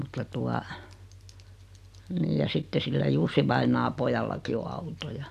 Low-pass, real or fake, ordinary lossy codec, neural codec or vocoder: 14.4 kHz; real; none; none